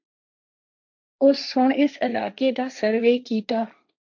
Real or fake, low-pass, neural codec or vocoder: fake; 7.2 kHz; codec, 16 kHz, 1.1 kbps, Voila-Tokenizer